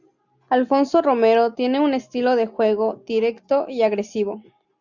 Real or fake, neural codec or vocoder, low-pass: real; none; 7.2 kHz